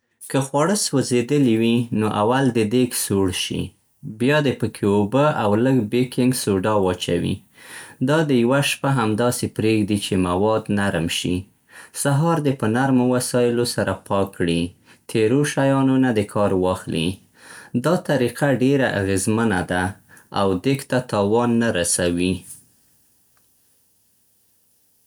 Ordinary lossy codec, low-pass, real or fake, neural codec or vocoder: none; none; real; none